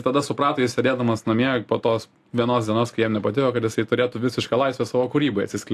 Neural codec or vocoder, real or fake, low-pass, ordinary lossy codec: none; real; 14.4 kHz; AAC, 64 kbps